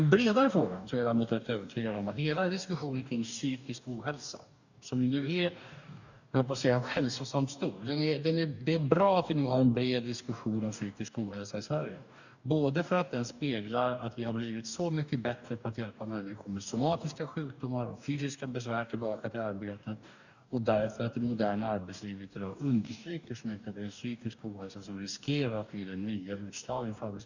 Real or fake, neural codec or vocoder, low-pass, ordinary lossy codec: fake; codec, 44.1 kHz, 2.6 kbps, DAC; 7.2 kHz; none